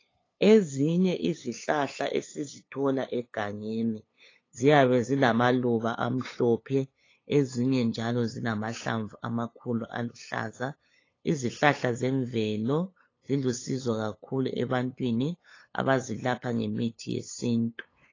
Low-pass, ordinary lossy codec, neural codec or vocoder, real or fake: 7.2 kHz; AAC, 32 kbps; codec, 16 kHz, 8 kbps, FunCodec, trained on LibriTTS, 25 frames a second; fake